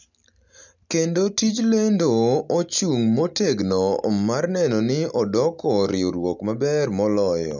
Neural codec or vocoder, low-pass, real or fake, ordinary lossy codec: none; 7.2 kHz; real; none